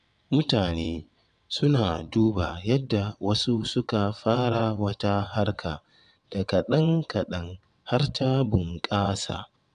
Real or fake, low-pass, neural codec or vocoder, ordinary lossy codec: fake; 9.9 kHz; vocoder, 22.05 kHz, 80 mel bands, WaveNeXt; none